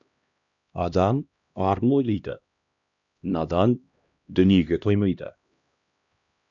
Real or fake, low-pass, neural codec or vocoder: fake; 7.2 kHz; codec, 16 kHz, 1 kbps, X-Codec, HuBERT features, trained on LibriSpeech